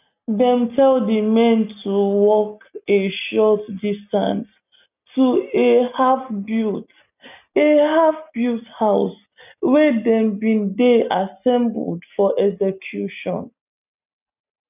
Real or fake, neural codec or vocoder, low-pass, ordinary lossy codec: real; none; 3.6 kHz; none